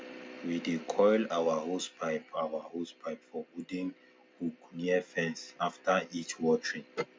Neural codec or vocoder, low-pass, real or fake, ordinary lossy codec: none; none; real; none